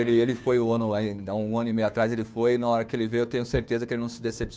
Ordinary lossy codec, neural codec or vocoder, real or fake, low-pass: none; codec, 16 kHz, 2 kbps, FunCodec, trained on Chinese and English, 25 frames a second; fake; none